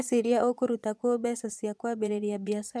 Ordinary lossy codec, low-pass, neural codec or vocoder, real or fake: none; none; vocoder, 22.05 kHz, 80 mel bands, Vocos; fake